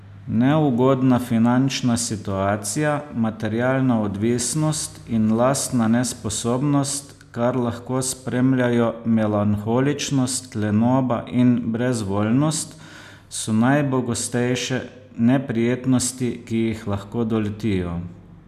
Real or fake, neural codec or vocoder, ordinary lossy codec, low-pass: real; none; none; 14.4 kHz